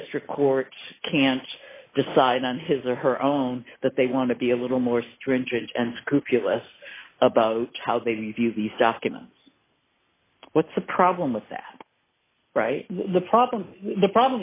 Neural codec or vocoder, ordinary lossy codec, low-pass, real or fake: none; AAC, 24 kbps; 3.6 kHz; real